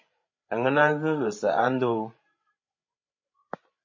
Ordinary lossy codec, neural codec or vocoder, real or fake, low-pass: MP3, 32 kbps; codec, 16 kHz, 16 kbps, FreqCodec, larger model; fake; 7.2 kHz